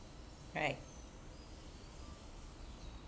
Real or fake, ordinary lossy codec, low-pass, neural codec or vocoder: real; none; none; none